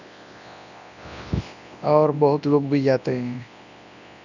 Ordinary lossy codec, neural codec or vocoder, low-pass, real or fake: none; codec, 24 kHz, 0.9 kbps, WavTokenizer, large speech release; 7.2 kHz; fake